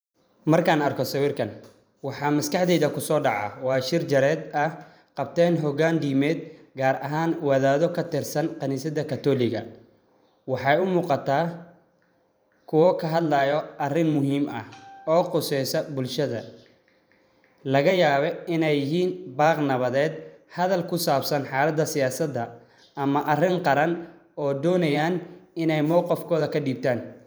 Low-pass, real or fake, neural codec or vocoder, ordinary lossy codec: none; fake; vocoder, 44.1 kHz, 128 mel bands every 512 samples, BigVGAN v2; none